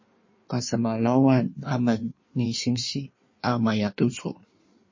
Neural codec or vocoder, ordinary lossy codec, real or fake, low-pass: codec, 16 kHz in and 24 kHz out, 1.1 kbps, FireRedTTS-2 codec; MP3, 32 kbps; fake; 7.2 kHz